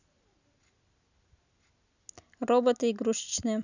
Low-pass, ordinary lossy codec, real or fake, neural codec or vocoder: 7.2 kHz; none; real; none